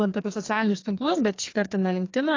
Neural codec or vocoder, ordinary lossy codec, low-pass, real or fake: codec, 44.1 kHz, 2.6 kbps, SNAC; AAC, 32 kbps; 7.2 kHz; fake